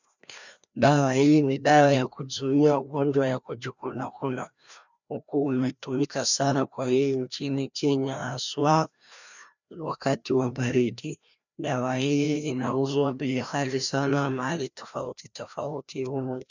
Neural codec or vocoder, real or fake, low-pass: codec, 16 kHz, 1 kbps, FreqCodec, larger model; fake; 7.2 kHz